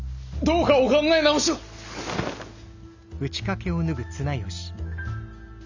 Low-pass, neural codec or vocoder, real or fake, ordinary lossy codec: 7.2 kHz; none; real; none